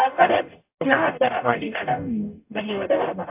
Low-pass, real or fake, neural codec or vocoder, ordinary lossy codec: 3.6 kHz; fake; codec, 44.1 kHz, 0.9 kbps, DAC; AAC, 32 kbps